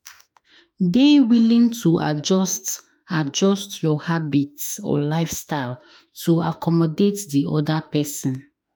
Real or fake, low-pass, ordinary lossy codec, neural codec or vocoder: fake; none; none; autoencoder, 48 kHz, 32 numbers a frame, DAC-VAE, trained on Japanese speech